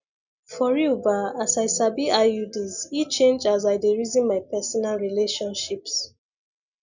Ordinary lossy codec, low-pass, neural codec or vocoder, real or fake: none; 7.2 kHz; none; real